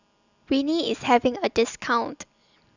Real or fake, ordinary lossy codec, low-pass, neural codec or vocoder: real; none; 7.2 kHz; none